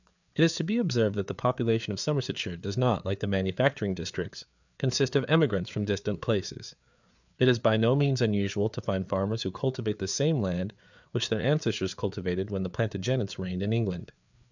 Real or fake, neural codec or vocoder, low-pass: fake; codec, 16 kHz, 4 kbps, FreqCodec, larger model; 7.2 kHz